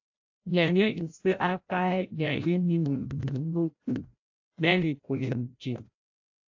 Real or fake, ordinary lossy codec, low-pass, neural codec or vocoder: fake; AAC, 48 kbps; 7.2 kHz; codec, 16 kHz, 0.5 kbps, FreqCodec, larger model